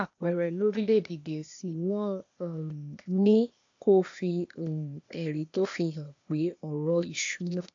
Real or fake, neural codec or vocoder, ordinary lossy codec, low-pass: fake; codec, 16 kHz, 0.8 kbps, ZipCodec; AAC, 48 kbps; 7.2 kHz